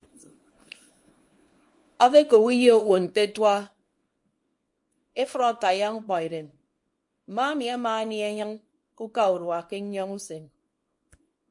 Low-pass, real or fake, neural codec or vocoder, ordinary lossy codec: 10.8 kHz; fake; codec, 24 kHz, 0.9 kbps, WavTokenizer, small release; MP3, 48 kbps